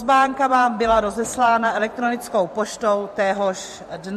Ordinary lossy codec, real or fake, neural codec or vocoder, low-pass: MP3, 64 kbps; fake; vocoder, 44.1 kHz, 128 mel bands every 512 samples, BigVGAN v2; 14.4 kHz